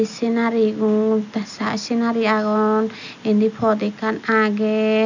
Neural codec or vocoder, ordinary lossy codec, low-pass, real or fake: none; none; 7.2 kHz; real